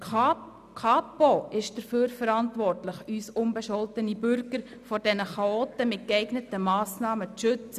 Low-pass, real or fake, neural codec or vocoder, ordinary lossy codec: 14.4 kHz; real; none; none